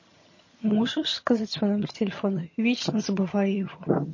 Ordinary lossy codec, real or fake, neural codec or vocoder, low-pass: MP3, 32 kbps; fake; vocoder, 22.05 kHz, 80 mel bands, HiFi-GAN; 7.2 kHz